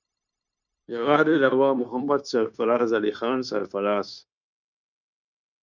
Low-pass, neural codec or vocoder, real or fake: 7.2 kHz; codec, 16 kHz, 0.9 kbps, LongCat-Audio-Codec; fake